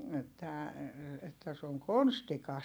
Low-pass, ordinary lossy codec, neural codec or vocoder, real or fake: none; none; vocoder, 44.1 kHz, 128 mel bands every 256 samples, BigVGAN v2; fake